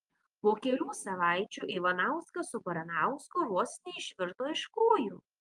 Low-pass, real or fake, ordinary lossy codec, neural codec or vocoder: 10.8 kHz; real; Opus, 32 kbps; none